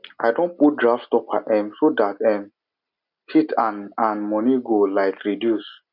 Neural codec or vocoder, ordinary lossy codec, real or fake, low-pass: none; none; real; 5.4 kHz